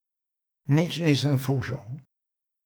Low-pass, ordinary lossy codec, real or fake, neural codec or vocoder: none; none; fake; codec, 44.1 kHz, 2.6 kbps, SNAC